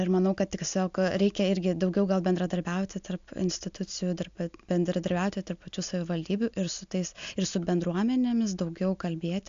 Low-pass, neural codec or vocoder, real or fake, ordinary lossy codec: 7.2 kHz; none; real; AAC, 64 kbps